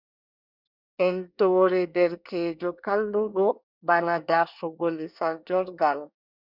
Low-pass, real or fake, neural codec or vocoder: 5.4 kHz; fake; codec, 24 kHz, 1 kbps, SNAC